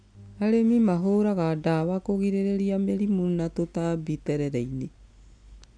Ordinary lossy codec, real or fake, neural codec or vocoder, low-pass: none; real; none; 9.9 kHz